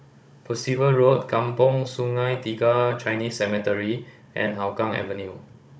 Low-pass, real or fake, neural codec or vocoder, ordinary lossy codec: none; fake; codec, 16 kHz, 16 kbps, FunCodec, trained on Chinese and English, 50 frames a second; none